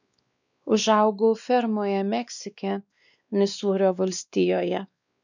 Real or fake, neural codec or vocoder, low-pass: fake; codec, 16 kHz, 2 kbps, X-Codec, WavLM features, trained on Multilingual LibriSpeech; 7.2 kHz